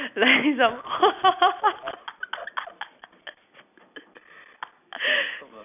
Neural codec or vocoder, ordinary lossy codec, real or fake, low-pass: none; none; real; 3.6 kHz